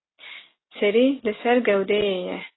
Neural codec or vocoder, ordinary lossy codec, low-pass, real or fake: none; AAC, 16 kbps; 7.2 kHz; real